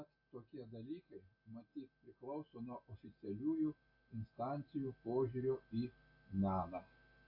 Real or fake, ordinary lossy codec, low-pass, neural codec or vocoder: real; AAC, 48 kbps; 5.4 kHz; none